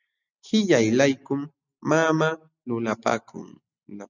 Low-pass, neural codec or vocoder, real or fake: 7.2 kHz; none; real